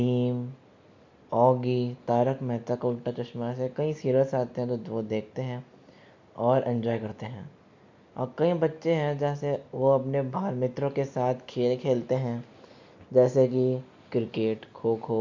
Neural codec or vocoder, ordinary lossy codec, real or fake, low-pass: none; MP3, 48 kbps; real; 7.2 kHz